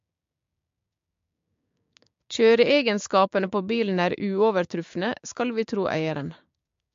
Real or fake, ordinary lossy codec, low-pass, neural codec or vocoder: fake; MP3, 48 kbps; 7.2 kHz; codec, 16 kHz, 6 kbps, DAC